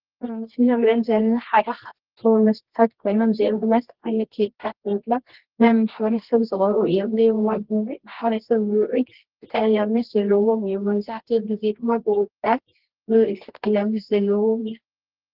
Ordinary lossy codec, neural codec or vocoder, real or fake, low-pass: Opus, 16 kbps; codec, 24 kHz, 0.9 kbps, WavTokenizer, medium music audio release; fake; 5.4 kHz